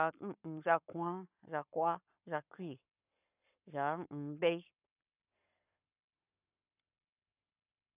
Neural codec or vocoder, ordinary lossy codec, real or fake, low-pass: codec, 44.1 kHz, 7.8 kbps, Pupu-Codec; none; fake; 3.6 kHz